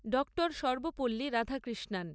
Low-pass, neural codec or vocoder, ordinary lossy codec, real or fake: none; none; none; real